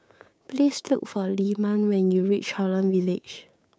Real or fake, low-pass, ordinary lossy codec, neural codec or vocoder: fake; none; none; codec, 16 kHz, 6 kbps, DAC